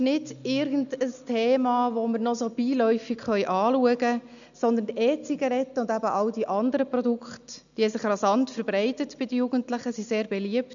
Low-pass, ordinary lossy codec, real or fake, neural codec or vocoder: 7.2 kHz; none; real; none